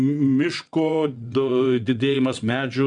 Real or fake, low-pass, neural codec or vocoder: fake; 9.9 kHz; vocoder, 22.05 kHz, 80 mel bands, WaveNeXt